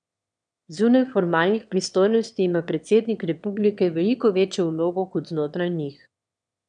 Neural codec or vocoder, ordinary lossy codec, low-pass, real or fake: autoencoder, 22.05 kHz, a latent of 192 numbers a frame, VITS, trained on one speaker; none; 9.9 kHz; fake